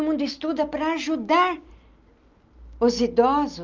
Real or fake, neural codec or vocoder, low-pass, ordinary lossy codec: real; none; 7.2 kHz; Opus, 24 kbps